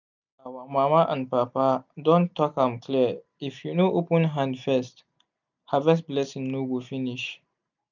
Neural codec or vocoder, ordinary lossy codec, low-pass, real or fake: none; none; 7.2 kHz; real